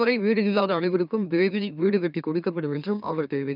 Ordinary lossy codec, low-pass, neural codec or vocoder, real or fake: none; 5.4 kHz; autoencoder, 44.1 kHz, a latent of 192 numbers a frame, MeloTTS; fake